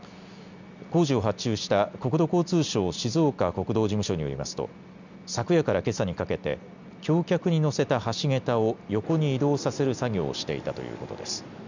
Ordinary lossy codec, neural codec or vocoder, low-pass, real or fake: none; none; 7.2 kHz; real